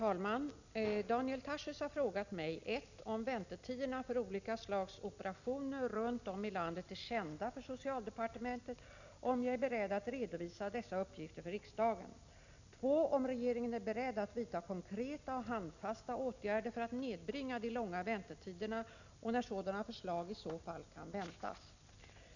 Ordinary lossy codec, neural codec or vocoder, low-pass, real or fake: none; none; 7.2 kHz; real